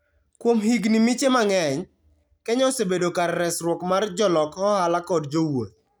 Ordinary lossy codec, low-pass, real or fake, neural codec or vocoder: none; none; real; none